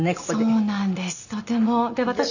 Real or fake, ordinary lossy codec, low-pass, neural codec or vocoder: real; none; 7.2 kHz; none